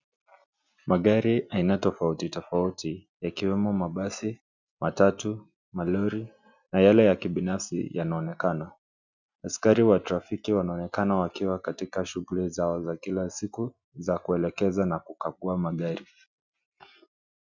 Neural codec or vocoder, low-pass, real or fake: none; 7.2 kHz; real